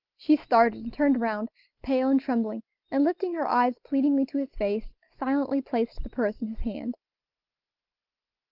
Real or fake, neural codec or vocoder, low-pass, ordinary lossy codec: real; none; 5.4 kHz; Opus, 32 kbps